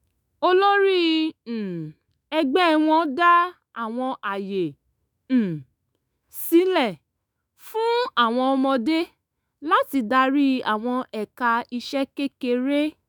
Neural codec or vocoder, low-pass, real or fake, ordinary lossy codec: autoencoder, 48 kHz, 128 numbers a frame, DAC-VAE, trained on Japanese speech; none; fake; none